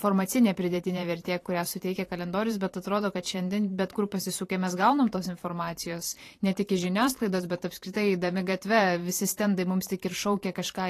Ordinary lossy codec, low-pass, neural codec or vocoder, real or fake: AAC, 48 kbps; 14.4 kHz; vocoder, 44.1 kHz, 128 mel bands every 512 samples, BigVGAN v2; fake